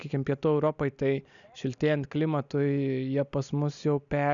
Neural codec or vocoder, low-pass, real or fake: none; 7.2 kHz; real